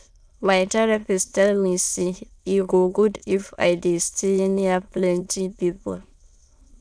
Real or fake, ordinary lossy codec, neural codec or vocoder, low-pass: fake; none; autoencoder, 22.05 kHz, a latent of 192 numbers a frame, VITS, trained on many speakers; none